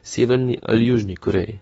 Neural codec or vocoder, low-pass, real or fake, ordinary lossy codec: autoencoder, 48 kHz, 32 numbers a frame, DAC-VAE, trained on Japanese speech; 19.8 kHz; fake; AAC, 24 kbps